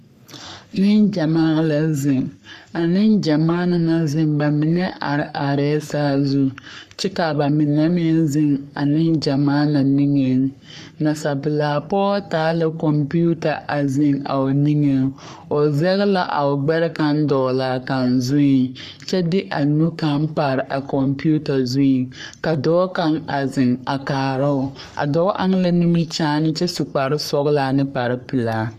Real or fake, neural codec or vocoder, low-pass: fake; codec, 44.1 kHz, 3.4 kbps, Pupu-Codec; 14.4 kHz